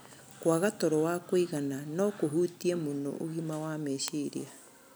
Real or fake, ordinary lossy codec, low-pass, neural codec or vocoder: real; none; none; none